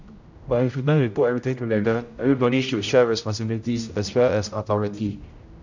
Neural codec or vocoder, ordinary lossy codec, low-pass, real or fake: codec, 16 kHz, 0.5 kbps, X-Codec, HuBERT features, trained on general audio; none; 7.2 kHz; fake